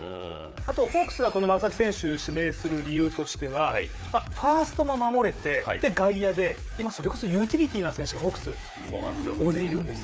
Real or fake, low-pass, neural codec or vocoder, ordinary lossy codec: fake; none; codec, 16 kHz, 4 kbps, FreqCodec, larger model; none